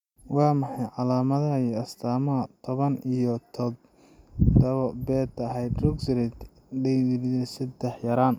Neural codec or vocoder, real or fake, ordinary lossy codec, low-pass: none; real; none; 19.8 kHz